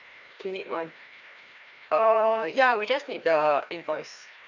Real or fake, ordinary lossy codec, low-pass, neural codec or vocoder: fake; none; 7.2 kHz; codec, 16 kHz, 1 kbps, FreqCodec, larger model